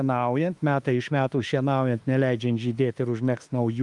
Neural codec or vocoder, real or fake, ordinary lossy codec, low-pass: autoencoder, 48 kHz, 32 numbers a frame, DAC-VAE, trained on Japanese speech; fake; Opus, 32 kbps; 10.8 kHz